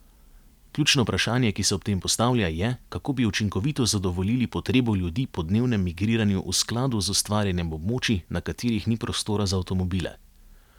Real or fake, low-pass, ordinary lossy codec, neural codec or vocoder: real; 19.8 kHz; none; none